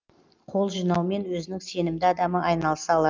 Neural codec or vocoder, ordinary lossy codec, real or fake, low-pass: none; Opus, 24 kbps; real; 7.2 kHz